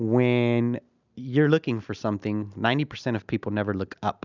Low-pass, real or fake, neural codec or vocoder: 7.2 kHz; real; none